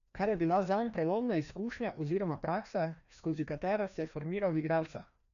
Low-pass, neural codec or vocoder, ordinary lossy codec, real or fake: 7.2 kHz; codec, 16 kHz, 1 kbps, FreqCodec, larger model; none; fake